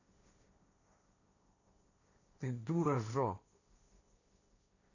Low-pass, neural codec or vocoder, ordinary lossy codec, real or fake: 7.2 kHz; codec, 16 kHz, 1.1 kbps, Voila-Tokenizer; none; fake